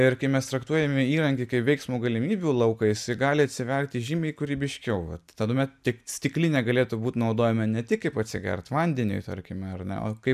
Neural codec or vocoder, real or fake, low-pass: none; real; 14.4 kHz